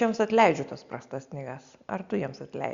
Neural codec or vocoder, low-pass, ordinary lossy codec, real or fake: none; 7.2 kHz; Opus, 64 kbps; real